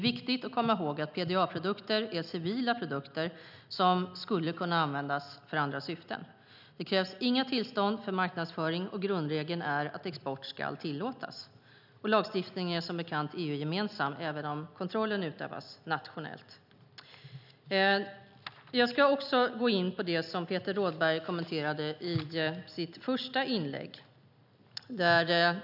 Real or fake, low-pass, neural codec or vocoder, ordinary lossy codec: real; 5.4 kHz; none; none